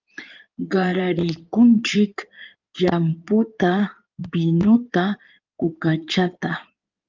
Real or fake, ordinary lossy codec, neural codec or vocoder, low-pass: fake; Opus, 24 kbps; codec, 16 kHz in and 24 kHz out, 2.2 kbps, FireRedTTS-2 codec; 7.2 kHz